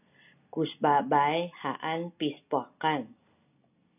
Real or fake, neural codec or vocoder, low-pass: real; none; 3.6 kHz